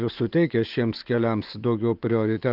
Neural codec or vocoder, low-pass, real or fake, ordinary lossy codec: vocoder, 24 kHz, 100 mel bands, Vocos; 5.4 kHz; fake; Opus, 32 kbps